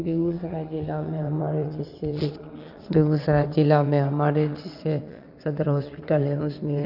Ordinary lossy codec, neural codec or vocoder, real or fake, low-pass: none; vocoder, 22.05 kHz, 80 mel bands, WaveNeXt; fake; 5.4 kHz